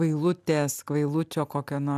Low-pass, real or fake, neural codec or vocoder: 14.4 kHz; real; none